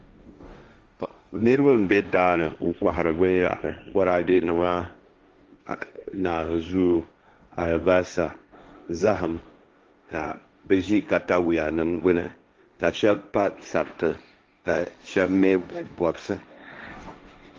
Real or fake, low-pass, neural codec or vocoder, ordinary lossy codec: fake; 7.2 kHz; codec, 16 kHz, 1.1 kbps, Voila-Tokenizer; Opus, 32 kbps